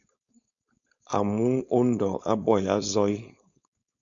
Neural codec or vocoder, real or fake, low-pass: codec, 16 kHz, 4.8 kbps, FACodec; fake; 7.2 kHz